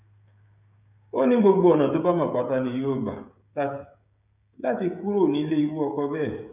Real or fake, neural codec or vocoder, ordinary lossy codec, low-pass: fake; codec, 16 kHz, 16 kbps, FreqCodec, smaller model; none; 3.6 kHz